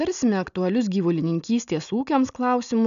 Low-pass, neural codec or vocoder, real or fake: 7.2 kHz; none; real